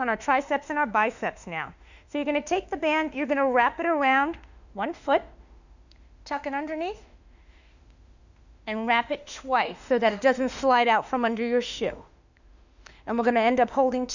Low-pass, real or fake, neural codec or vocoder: 7.2 kHz; fake; autoencoder, 48 kHz, 32 numbers a frame, DAC-VAE, trained on Japanese speech